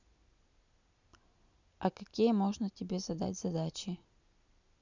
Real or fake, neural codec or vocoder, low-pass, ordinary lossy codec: real; none; 7.2 kHz; none